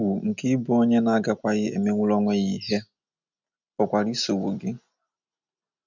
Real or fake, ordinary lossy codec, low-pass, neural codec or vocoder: real; none; 7.2 kHz; none